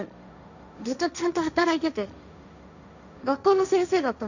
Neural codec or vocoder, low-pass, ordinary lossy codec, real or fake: codec, 16 kHz, 1.1 kbps, Voila-Tokenizer; 7.2 kHz; none; fake